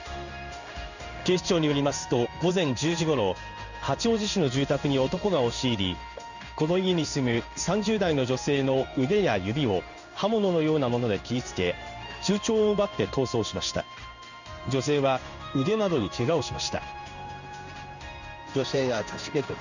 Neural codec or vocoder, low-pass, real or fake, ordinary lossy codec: codec, 16 kHz in and 24 kHz out, 1 kbps, XY-Tokenizer; 7.2 kHz; fake; none